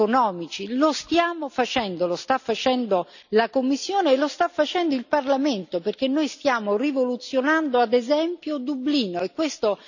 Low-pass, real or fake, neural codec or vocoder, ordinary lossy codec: 7.2 kHz; real; none; none